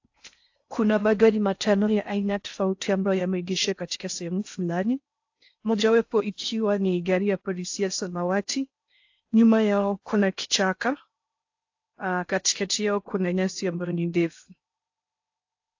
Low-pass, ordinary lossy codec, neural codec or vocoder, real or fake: 7.2 kHz; AAC, 48 kbps; codec, 16 kHz in and 24 kHz out, 0.6 kbps, FocalCodec, streaming, 4096 codes; fake